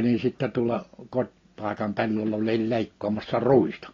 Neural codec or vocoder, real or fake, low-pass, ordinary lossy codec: none; real; 7.2 kHz; AAC, 32 kbps